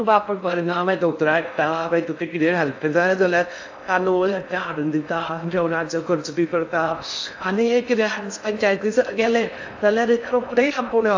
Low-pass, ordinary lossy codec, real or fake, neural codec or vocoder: 7.2 kHz; AAC, 48 kbps; fake; codec, 16 kHz in and 24 kHz out, 0.6 kbps, FocalCodec, streaming, 4096 codes